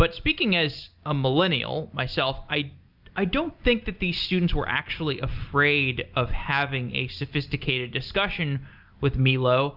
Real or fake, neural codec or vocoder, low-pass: real; none; 5.4 kHz